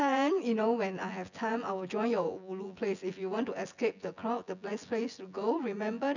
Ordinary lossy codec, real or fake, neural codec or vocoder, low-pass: none; fake; vocoder, 24 kHz, 100 mel bands, Vocos; 7.2 kHz